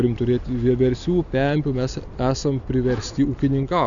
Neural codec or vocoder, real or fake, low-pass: none; real; 7.2 kHz